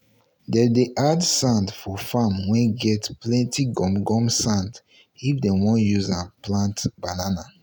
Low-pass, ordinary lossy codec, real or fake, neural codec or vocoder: none; none; real; none